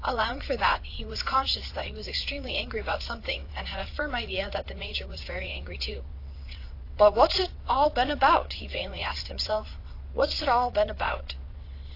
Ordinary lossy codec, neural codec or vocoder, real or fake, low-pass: AAC, 32 kbps; vocoder, 22.05 kHz, 80 mel bands, WaveNeXt; fake; 5.4 kHz